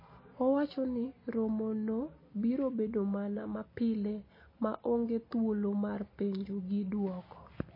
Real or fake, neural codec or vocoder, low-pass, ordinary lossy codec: real; none; 5.4 kHz; MP3, 24 kbps